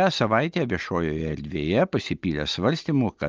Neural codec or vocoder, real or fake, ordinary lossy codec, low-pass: none; real; Opus, 24 kbps; 7.2 kHz